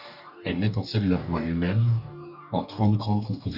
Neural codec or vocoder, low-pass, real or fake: codec, 44.1 kHz, 2.6 kbps, DAC; 5.4 kHz; fake